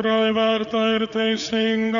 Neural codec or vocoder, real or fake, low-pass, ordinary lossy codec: codec, 16 kHz, 4 kbps, FreqCodec, larger model; fake; 7.2 kHz; MP3, 96 kbps